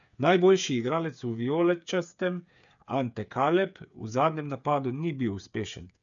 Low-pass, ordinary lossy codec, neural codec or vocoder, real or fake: 7.2 kHz; none; codec, 16 kHz, 8 kbps, FreqCodec, smaller model; fake